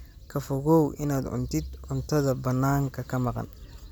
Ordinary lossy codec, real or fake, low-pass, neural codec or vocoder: none; real; none; none